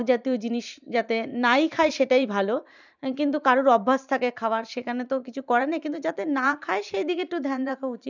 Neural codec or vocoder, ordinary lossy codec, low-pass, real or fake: vocoder, 44.1 kHz, 128 mel bands every 512 samples, BigVGAN v2; none; 7.2 kHz; fake